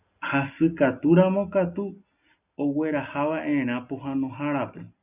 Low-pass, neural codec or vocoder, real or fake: 3.6 kHz; none; real